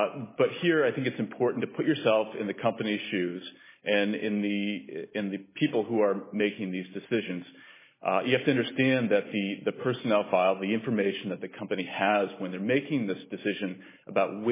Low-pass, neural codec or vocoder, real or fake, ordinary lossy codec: 3.6 kHz; none; real; MP3, 16 kbps